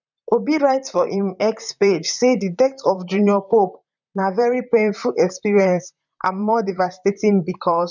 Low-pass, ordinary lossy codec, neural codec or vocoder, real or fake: 7.2 kHz; none; vocoder, 44.1 kHz, 128 mel bands, Pupu-Vocoder; fake